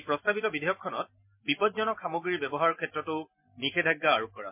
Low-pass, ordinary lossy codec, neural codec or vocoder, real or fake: 3.6 kHz; MP3, 32 kbps; none; real